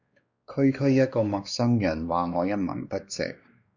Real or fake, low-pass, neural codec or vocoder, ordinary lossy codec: fake; 7.2 kHz; codec, 16 kHz, 2 kbps, X-Codec, WavLM features, trained on Multilingual LibriSpeech; Opus, 64 kbps